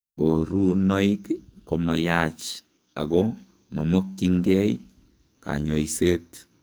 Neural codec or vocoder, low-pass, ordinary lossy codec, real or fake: codec, 44.1 kHz, 2.6 kbps, SNAC; none; none; fake